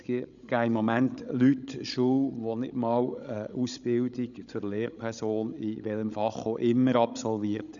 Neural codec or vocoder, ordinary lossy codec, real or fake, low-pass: codec, 16 kHz, 16 kbps, FreqCodec, larger model; none; fake; 7.2 kHz